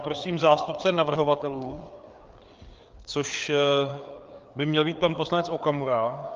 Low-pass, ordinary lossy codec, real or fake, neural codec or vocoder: 7.2 kHz; Opus, 32 kbps; fake; codec, 16 kHz, 4 kbps, FreqCodec, larger model